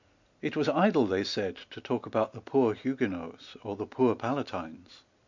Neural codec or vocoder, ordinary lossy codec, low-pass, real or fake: none; MP3, 64 kbps; 7.2 kHz; real